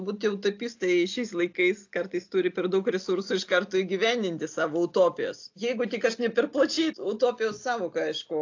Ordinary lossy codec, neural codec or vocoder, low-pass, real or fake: AAC, 48 kbps; none; 7.2 kHz; real